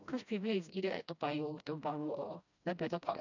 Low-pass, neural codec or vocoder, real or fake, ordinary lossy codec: 7.2 kHz; codec, 16 kHz, 1 kbps, FreqCodec, smaller model; fake; none